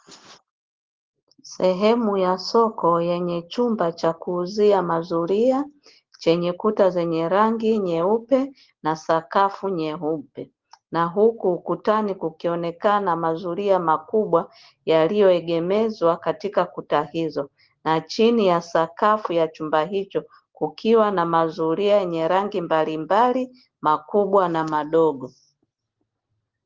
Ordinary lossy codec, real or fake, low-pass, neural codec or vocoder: Opus, 16 kbps; real; 7.2 kHz; none